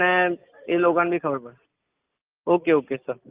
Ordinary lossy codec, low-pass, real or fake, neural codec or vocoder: Opus, 32 kbps; 3.6 kHz; real; none